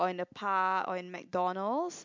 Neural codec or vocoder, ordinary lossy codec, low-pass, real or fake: none; MP3, 64 kbps; 7.2 kHz; real